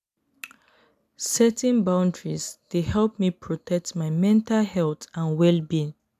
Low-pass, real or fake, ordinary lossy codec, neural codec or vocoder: 14.4 kHz; real; none; none